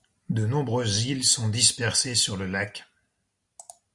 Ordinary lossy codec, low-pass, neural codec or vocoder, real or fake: Opus, 64 kbps; 10.8 kHz; none; real